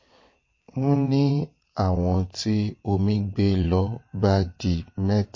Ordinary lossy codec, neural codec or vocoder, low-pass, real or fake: MP3, 32 kbps; vocoder, 22.05 kHz, 80 mel bands, WaveNeXt; 7.2 kHz; fake